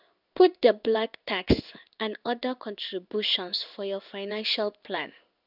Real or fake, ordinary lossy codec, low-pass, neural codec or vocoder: fake; none; 5.4 kHz; codec, 16 kHz in and 24 kHz out, 1 kbps, XY-Tokenizer